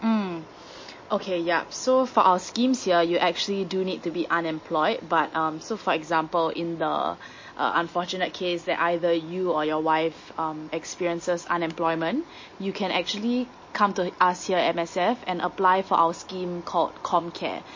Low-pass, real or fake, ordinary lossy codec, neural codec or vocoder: 7.2 kHz; real; MP3, 32 kbps; none